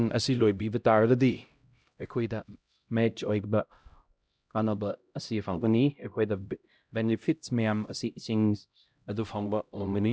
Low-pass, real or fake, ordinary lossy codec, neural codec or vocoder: none; fake; none; codec, 16 kHz, 0.5 kbps, X-Codec, HuBERT features, trained on LibriSpeech